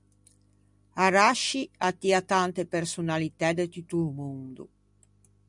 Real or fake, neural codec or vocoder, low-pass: real; none; 10.8 kHz